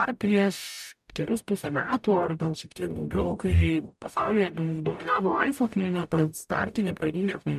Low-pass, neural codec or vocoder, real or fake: 14.4 kHz; codec, 44.1 kHz, 0.9 kbps, DAC; fake